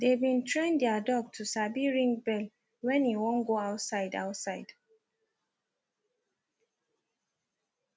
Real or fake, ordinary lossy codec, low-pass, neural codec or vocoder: real; none; none; none